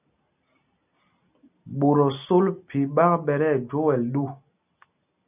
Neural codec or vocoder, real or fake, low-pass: none; real; 3.6 kHz